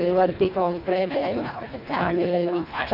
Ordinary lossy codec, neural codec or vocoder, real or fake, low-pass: none; codec, 24 kHz, 1.5 kbps, HILCodec; fake; 5.4 kHz